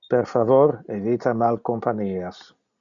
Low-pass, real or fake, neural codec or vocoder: 7.2 kHz; real; none